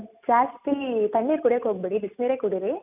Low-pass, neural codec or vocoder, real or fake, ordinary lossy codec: 3.6 kHz; none; real; none